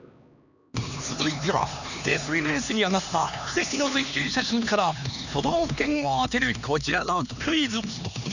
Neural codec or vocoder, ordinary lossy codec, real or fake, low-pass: codec, 16 kHz, 2 kbps, X-Codec, HuBERT features, trained on LibriSpeech; none; fake; 7.2 kHz